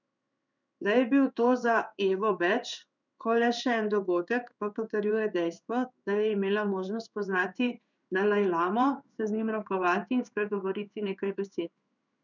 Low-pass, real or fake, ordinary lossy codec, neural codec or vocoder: 7.2 kHz; fake; none; codec, 16 kHz in and 24 kHz out, 1 kbps, XY-Tokenizer